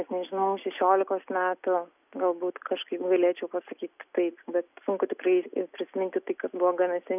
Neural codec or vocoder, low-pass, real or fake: none; 3.6 kHz; real